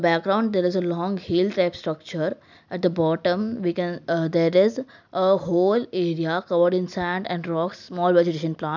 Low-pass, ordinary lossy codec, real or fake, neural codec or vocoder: 7.2 kHz; none; real; none